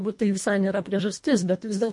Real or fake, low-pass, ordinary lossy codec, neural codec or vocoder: fake; 10.8 kHz; MP3, 48 kbps; codec, 24 kHz, 1.5 kbps, HILCodec